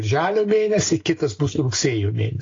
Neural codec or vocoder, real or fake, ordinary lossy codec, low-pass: none; real; AAC, 32 kbps; 7.2 kHz